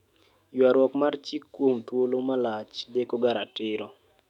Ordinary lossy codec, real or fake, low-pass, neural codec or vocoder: none; fake; 19.8 kHz; autoencoder, 48 kHz, 128 numbers a frame, DAC-VAE, trained on Japanese speech